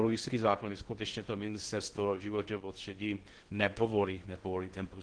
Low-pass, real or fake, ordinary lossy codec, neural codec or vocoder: 9.9 kHz; fake; Opus, 16 kbps; codec, 16 kHz in and 24 kHz out, 0.6 kbps, FocalCodec, streaming, 2048 codes